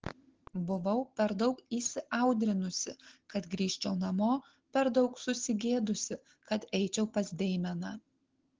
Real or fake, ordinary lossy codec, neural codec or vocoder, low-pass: real; Opus, 16 kbps; none; 7.2 kHz